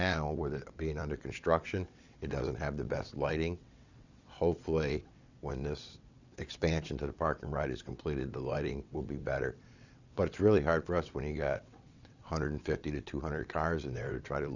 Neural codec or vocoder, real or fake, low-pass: vocoder, 22.05 kHz, 80 mel bands, WaveNeXt; fake; 7.2 kHz